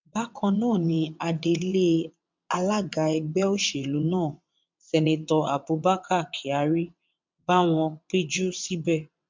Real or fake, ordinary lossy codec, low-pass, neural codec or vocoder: fake; MP3, 64 kbps; 7.2 kHz; vocoder, 22.05 kHz, 80 mel bands, WaveNeXt